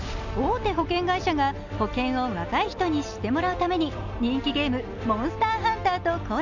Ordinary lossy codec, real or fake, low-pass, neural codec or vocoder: none; real; 7.2 kHz; none